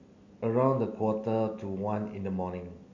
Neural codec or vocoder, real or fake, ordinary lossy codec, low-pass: none; real; MP3, 48 kbps; 7.2 kHz